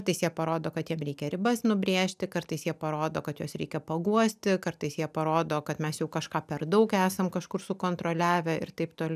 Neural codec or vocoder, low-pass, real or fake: none; 14.4 kHz; real